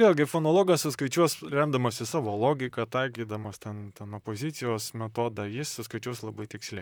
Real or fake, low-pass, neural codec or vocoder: fake; 19.8 kHz; vocoder, 44.1 kHz, 128 mel bands, Pupu-Vocoder